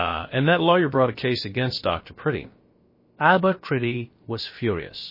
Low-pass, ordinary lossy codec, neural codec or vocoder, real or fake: 5.4 kHz; MP3, 24 kbps; codec, 16 kHz, about 1 kbps, DyCAST, with the encoder's durations; fake